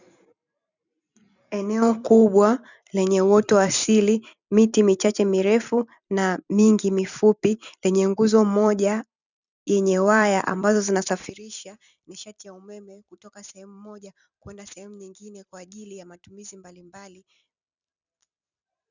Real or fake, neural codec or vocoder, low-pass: fake; vocoder, 44.1 kHz, 128 mel bands every 256 samples, BigVGAN v2; 7.2 kHz